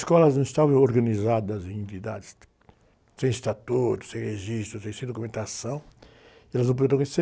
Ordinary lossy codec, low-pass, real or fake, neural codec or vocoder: none; none; real; none